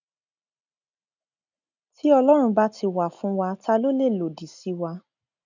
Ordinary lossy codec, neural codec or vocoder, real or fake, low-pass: none; none; real; 7.2 kHz